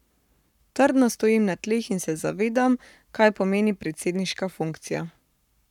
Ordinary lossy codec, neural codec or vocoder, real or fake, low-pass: none; codec, 44.1 kHz, 7.8 kbps, Pupu-Codec; fake; 19.8 kHz